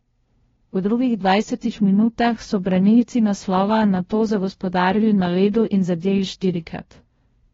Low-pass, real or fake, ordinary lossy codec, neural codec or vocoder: 7.2 kHz; fake; AAC, 24 kbps; codec, 16 kHz, 0.5 kbps, FunCodec, trained on LibriTTS, 25 frames a second